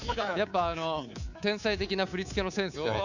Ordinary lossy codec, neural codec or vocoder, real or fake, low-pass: none; codec, 24 kHz, 3.1 kbps, DualCodec; fake; 7.2 kHz